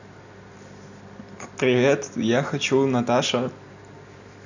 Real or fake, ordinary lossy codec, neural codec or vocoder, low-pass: real; none; none; 7.2 kHz